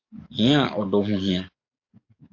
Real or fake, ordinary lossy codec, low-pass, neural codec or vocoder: fake; AAC, 48 kbps; 7.2 kHz; codec, 44.1 kHz, 7.8 kbps, DAC